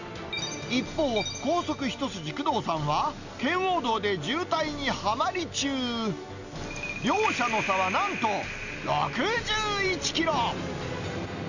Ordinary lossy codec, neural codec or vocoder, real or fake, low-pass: none; none; real; 7.2 kHz